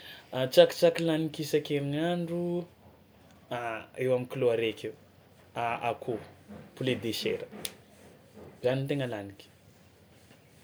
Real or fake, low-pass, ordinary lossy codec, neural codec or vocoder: real; none; none; none